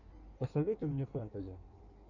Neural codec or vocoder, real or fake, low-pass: codec, 16 kHz in and 24 kHz out, 1.1 kbps, FireRedTTS-2 codec; fake; 7.2 kHz